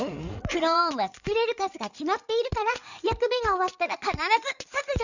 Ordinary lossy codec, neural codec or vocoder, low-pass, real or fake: none; codec, 16 kHz in and 24 kHz out, 2.2 kbps, FireRedTTS-2 codec; 7.2 kHz; fake